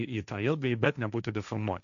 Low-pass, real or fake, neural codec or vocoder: 7.2 kHz; fake; codec, 16 kHz, 1.1 kbps, Voila-Tokenizer